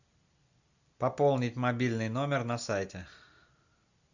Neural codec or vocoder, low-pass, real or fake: none; 7.2 kHz; real